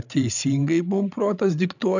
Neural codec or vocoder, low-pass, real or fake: codec, 16 kHz, 16 kbps, FreqCodec, larger model; 7.2 kHz; fake